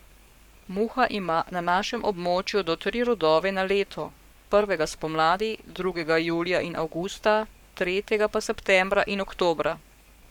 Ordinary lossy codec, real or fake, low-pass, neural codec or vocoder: none; fake; 19.8 kHz; codec, 44.1 kHz, 7.8 kbps, Pupu-Codec